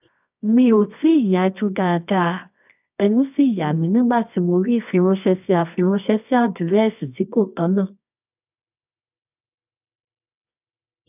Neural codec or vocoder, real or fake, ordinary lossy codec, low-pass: codec, 24 kHz, 0.9 kbps, WavTokenizer, medium music audio release; fake; none; 3.6 kHz